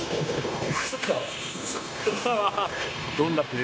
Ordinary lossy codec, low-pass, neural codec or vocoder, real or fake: none; none; codec, 16 kHz, 0.9 kbps, LongCat-Audio-Codec; fake